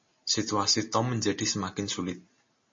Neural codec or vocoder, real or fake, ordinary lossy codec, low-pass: none; real; MP3, 32 kbps; 7.2 kHz